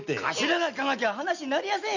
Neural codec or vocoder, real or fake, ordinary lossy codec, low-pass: none; real; none; 7.2 kHz